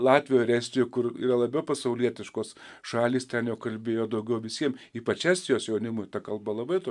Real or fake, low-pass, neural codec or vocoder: real; 10.8 kHz; none